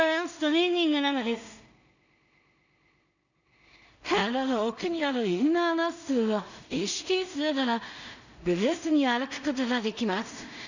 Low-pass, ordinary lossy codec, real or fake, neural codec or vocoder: 7.2 kHz; none; fake; codec, 16 kHz in and 24 kHz out, 0.4 kbps, LongCat-Audio-Codec, two codebook decoder